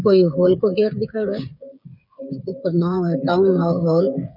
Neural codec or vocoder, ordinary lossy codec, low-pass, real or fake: codec, 16 kHz, 8 kbps, FreqCodec, smaller model; none; 5.4 kHz; fake